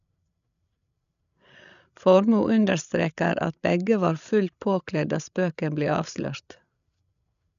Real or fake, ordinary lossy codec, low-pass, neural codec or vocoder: fake; none; 7.2 kHz; codec, 16 kHz, 8 kbps, FreqCodec, larger model